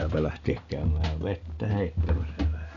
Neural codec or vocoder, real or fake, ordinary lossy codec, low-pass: none; real; none; 7.2 kHz